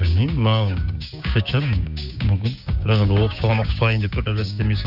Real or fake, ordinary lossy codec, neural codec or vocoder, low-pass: fake; none; codec, 16 kHz, 6 kbps, DAC; 5.4 kHz